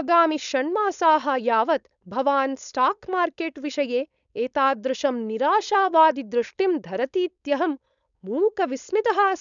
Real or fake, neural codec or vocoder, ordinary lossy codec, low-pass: fake; codec, 16 kHz, 4.8 kbps, FACodec; none; 7.2 kHz